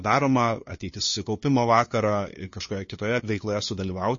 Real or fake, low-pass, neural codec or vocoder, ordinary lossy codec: fake; 7.2 kHz; codec, 16 kHz, 2 kbps, FunCodec, trained on LibriTTS, 25 frames a second; MP3, 32 kbps